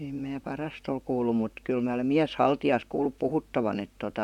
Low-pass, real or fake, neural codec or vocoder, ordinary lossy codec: 19.8 kHz; fake; vocoder, 44.1 kHz, 128 mel bands, Pupu-Vocoder; none